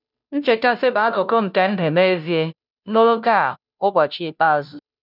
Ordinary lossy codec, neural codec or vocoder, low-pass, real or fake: none; codec, 16 kHz, 0.5 kbps, FunCodec, trained on Chinese and English, 25 frames a second; 5.4 kHz; fake